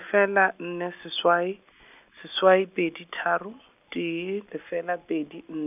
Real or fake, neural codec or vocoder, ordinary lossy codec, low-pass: real; none; none; 3.6 kHz